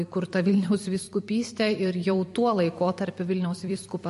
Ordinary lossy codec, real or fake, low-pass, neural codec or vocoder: MP3, 48 kbps; fake; 14.4 kHz; vocoder, 44.1 kHz, 128 mel bands every 256 samples, BigVGAN v2